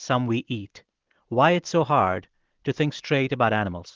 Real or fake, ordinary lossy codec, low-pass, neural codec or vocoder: real; Opus, 16 kbps; 7.2 kHz; none